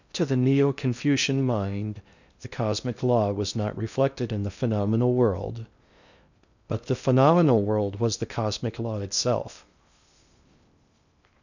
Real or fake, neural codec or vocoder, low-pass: fake; codec, 16 kHz in and 24 kHz out, 0.6 kbps, FocalCodec, streaming, 2048 codes; 7.2 kHz